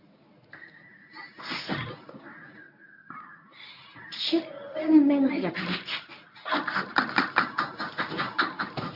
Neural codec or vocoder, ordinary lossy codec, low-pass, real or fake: codec, 24 kHz, 0.9 kbps, WavTokenizer, medium speech release version 1; AAC, 48 kbps; 5.4 kHz; fake